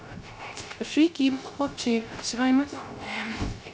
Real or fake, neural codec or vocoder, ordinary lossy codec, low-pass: fake; codec, 16 kHz, 0.3 kbps, FocalCodec; none; none